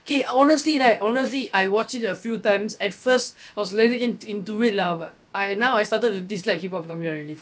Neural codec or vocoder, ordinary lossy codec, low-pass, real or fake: codec, 16 kHz, about 1 kbps, DyCAST, with the encoder's durations; none; none; fake